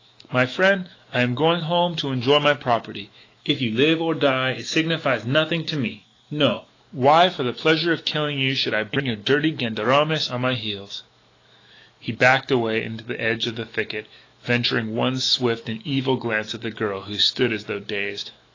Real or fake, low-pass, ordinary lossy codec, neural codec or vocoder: fake; 7.2 kHz; AAC, 32 kbps; autoencoder, 48 kHz, 128 numbers a frame, DAC-VAE, trained on Japanese speech